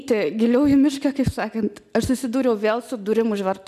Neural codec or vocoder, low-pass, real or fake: none; 14.4 kHz; real